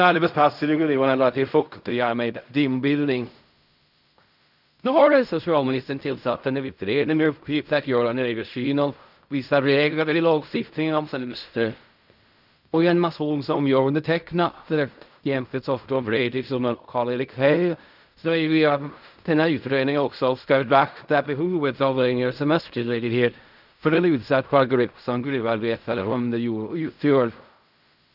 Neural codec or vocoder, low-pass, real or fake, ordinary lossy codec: codec, 16 kHz in and 24 kHz out, 0.4 kbps, LongCat-Audio-Codec, fine tuned four codebook decoder; 5.4 kHz; fake; none